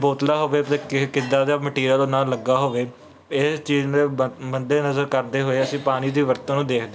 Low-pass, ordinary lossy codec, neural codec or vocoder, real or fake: none; none; none; real